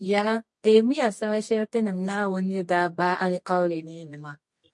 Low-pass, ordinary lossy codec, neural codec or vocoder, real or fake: 10.8 kHz; MP3, 48 kbps; codec, 24 kHz, 0.9 kbps, WavTokenizer, medium music audio release; fake